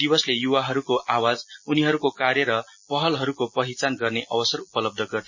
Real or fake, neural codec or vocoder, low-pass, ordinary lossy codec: real; none; 7.2 kHz; MP3, 32 kbps